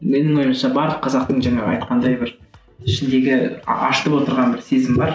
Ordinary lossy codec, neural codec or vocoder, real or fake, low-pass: none; none; real; none